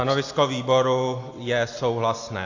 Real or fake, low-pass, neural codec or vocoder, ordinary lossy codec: real; 7.2 kHz; none; AAC, 48 kbps